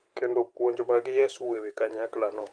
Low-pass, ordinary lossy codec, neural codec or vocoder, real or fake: 9.9 kHz; Opus, 24 kbps; vocoder, 44.1 kHz, 128 mel bands every 512 samples, BigVGAN v2; fake